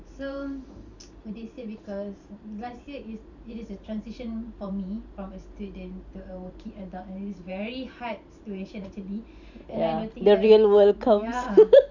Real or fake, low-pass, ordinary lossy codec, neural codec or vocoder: fake; 7.2 kHz; none; vocoder, 44.1 kHz, 128 mel bands every 512 samples, BigVGAN v2